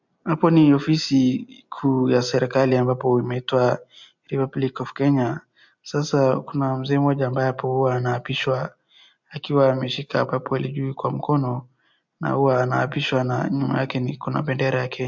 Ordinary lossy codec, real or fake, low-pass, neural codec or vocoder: MP3, 64 kbps; real; 7.2 kHz; none